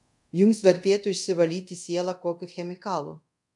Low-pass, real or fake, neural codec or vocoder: 10.8 kHz; fake; codec, 24 kHz, 0.5 kbps, DualCodec